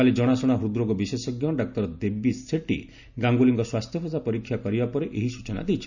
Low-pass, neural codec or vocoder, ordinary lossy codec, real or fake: 7.2 kHz; none; none; real